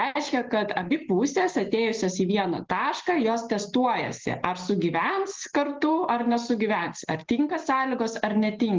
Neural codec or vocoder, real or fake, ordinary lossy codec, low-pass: none; real; Opus, 16 kbps; 7.2 kHz